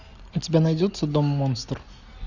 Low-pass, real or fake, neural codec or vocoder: 7.2 kHz; real; none